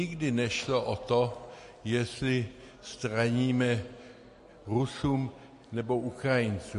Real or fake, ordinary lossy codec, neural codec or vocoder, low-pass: real; MP3, 48 kbps; none; 14.4 kHz